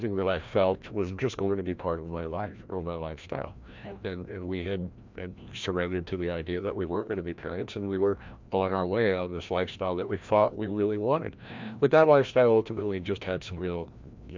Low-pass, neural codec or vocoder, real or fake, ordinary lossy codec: 7.2 kHz; codec, 16 kHz, 1 kbps, FreqCodec, larger model; fake; MP3, 64 kbps